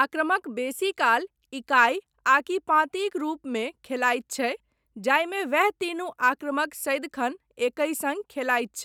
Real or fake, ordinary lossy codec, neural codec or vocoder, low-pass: real; none; none; 19.8 kHz